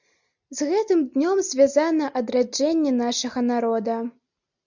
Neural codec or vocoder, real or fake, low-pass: none; real; 7.2 kHz